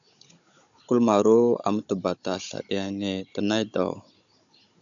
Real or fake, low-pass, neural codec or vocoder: fake; 7.2 kHz; codec, 16 kHz, 16 kbps, FunCodec, trained on Chinese and English, 50 frames a second